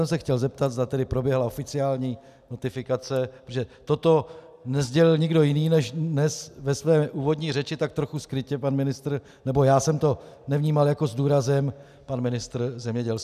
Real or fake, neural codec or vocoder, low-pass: real; none; 14.4 kHz